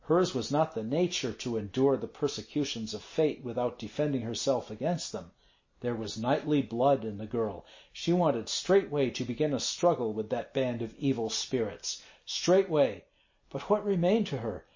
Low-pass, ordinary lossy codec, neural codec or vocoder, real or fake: 7.2 kHz; MP3, 32 kbps; none; real